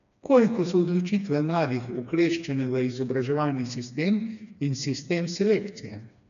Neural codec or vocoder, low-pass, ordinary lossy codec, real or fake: codec, 16 kHz, 2 kbps, FreqCodec, smaller model; 7.2 kHz; none; fake